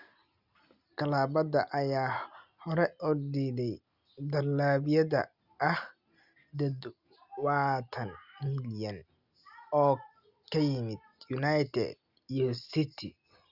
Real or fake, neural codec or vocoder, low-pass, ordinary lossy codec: real; none; 5.4 kHz; Opus, 64 kbps